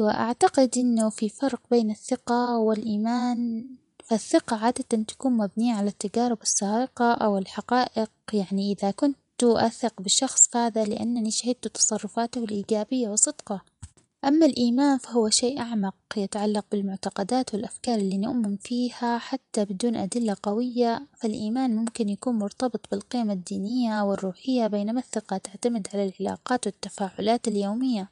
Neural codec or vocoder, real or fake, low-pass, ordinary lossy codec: vocoder, 24 kHz, 100 mel bands, Vocos; fake; 10.8 kHz; none